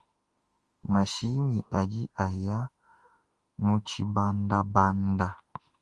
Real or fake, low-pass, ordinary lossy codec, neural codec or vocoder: fake; 10.8 kHz; Opus, 24 kbps; autoencoder, 48 kHz, 32 numbers a frame, DAC-VAE, trained on Japanese speech